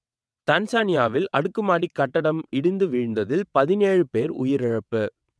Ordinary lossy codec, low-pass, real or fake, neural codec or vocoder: none; none; fake; vocoder, 22.05 kHz, 80 mel bands, WaveNeXt